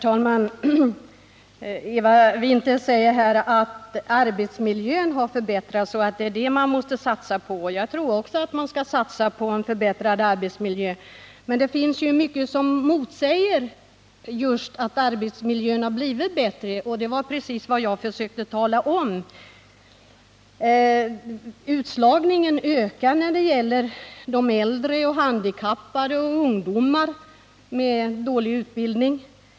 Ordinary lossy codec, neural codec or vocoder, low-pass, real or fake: none; none; none; real